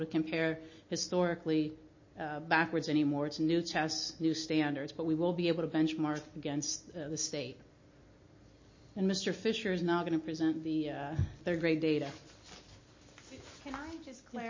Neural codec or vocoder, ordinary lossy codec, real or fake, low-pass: none; MP3, 32 kbps; real; 7.2 kHz